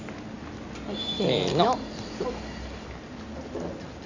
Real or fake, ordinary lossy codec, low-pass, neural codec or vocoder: real; none; 7.2 kHz; none